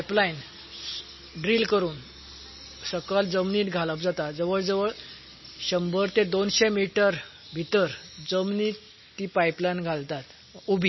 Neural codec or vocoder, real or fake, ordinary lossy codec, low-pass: none; real; MP3, 24 kbps; 7.2 kHz